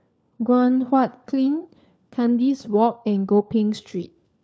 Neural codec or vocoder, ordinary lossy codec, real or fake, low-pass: codec, 16 kHz, 4 kbps, FunCodec, trained on LibriTTS, 50 frames a second; none; fake; none